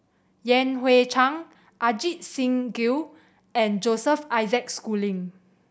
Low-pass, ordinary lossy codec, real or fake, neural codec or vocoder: none; none; real; none